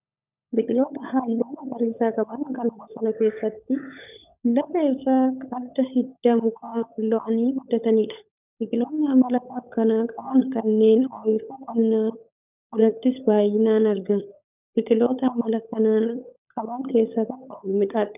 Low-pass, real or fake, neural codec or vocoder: 3.6 kHz; fake; codec, 16 kHz, 16 kbps, FunCodec, trained on LibriTTS, 50 frames a second